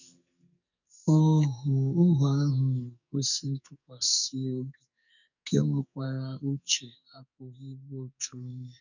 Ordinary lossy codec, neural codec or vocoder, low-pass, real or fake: none; codec, 44.1 kHz, 2.6 kbps, SNAC; 7.2 kHz; fake